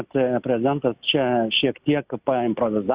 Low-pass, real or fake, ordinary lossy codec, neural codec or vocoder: 3.6 kHz; real; Opus, 64 kbps; none